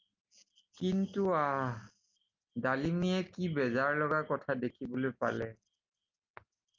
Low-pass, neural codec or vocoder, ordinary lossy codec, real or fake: 7.2 kHz; none; Opus, 32 kbps; real